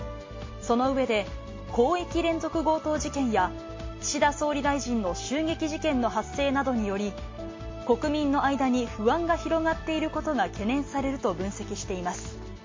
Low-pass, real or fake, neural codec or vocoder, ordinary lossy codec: 7.2 kHz; real; none; MP3, 32 kbps